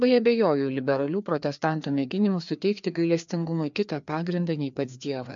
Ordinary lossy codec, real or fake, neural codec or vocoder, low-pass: MP3, 64 kbps; fake; codec, 16 kHz, 2 kbps, FreqCodec, larger model; 7.2 kHz